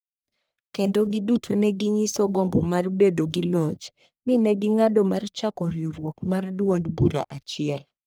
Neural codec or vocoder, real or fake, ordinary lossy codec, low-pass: codec, 44.1 kHz, 1.7 kbps, Pupu-Codec; fake; none; none